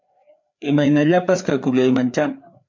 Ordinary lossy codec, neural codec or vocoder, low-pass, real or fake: MP3, 64 kbps; codec, 16 kHz, 4 kbps, FreqCodec, larger model; 7.2 kHz; fake